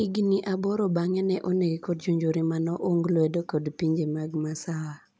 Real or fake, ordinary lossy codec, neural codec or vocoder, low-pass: real; none; none; none